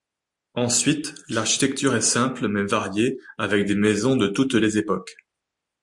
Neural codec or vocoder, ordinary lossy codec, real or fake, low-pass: none; MP3, 64 kbps; real; 10.8 kHz